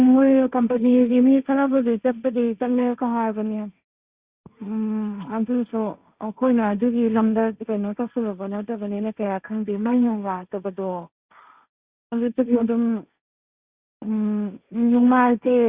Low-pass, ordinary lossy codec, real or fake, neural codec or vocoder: 3.6 kHz; Opus, 64 kbps; fake; codec, 16 kHz, 1.1 kbps, Voila-Tokenizer